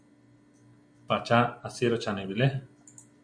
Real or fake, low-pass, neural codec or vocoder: real; 9.9 kHz; none